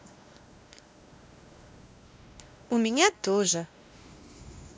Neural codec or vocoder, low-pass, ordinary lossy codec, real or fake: codec, 16 kHz, 1 kbps, X-Codec, WavLM features, trained on Multilingual LibriSpeech; none; none; fake